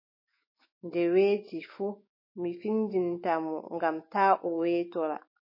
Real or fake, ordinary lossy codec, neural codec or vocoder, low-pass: fake; MP3, 24 kbps; autoencoder, 48 kHz, 128 numbers a frame, DAC-VAE, trained on Japanese speech; 5.4 kHz